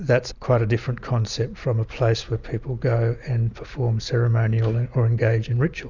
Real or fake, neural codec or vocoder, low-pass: real; none; 7.2 kHz